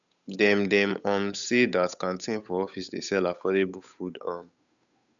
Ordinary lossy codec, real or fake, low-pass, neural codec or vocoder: none; fake; 7.2 kHz; codec, 16 kHz, 8 kbps, FunCodec, trained on Chinese and English, 25 frames a second